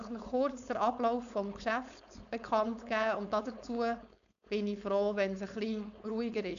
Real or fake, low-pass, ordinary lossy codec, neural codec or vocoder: fake; 7.2 kHz; none; codec, 16 kHz, 4.8 kbps, FACodec